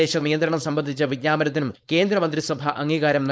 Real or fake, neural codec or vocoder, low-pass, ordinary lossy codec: fake; codec, 16 kHz, 4.8 kbps, FACodec; none; none